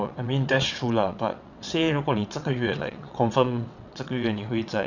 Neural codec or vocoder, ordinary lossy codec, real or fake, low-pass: vocoder, 22.05 kHz, 80 mel bands, WaveNeXt; none; fake; 7.2 kHz